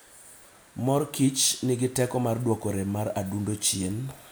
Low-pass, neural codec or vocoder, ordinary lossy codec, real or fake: none; none; none; real